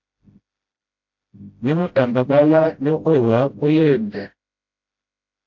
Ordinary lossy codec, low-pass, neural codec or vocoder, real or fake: MP3, 48 kbps; 7.2 kHz; codec, 16 kHz, 0.5 kbps, FreqCodec, smaller model; fake